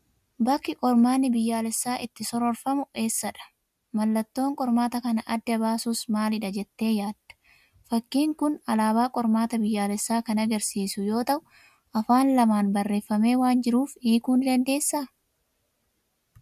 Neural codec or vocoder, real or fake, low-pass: none; real; 14.4 kHz